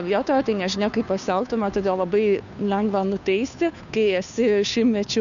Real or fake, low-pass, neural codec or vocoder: fake; 7.2 kHz; codec, 16 kHz, 2 kbps, FunCodec, trained on Chinese and English, 25 frames a second